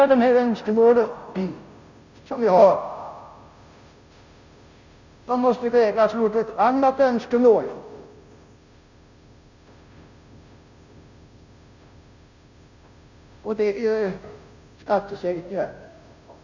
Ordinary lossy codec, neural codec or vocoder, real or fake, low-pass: none; codec, 16 kHz, 0.5 kbps, FunCodec, trained on Chinese and English, 25 frames a second; fake; 7.2 kHz